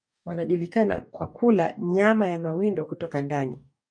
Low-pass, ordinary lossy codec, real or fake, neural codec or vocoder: 9.9 kHz; MP3, 64 kbps; fake; codec, 44.1 kHz, 2.6 kbps, DAC